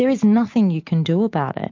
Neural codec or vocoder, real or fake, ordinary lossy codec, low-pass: none; real; MP3, 48 kbps; 7.2 kHz